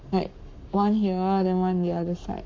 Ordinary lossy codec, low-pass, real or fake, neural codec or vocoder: MP3, 48 kbps; 7.2 kHz; fake; codec, 44.1 kHz, 7.8 kbps, Pupu-Codec